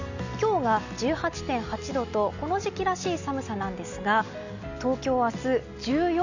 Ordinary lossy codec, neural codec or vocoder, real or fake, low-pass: none; none; real; 7.2 kHz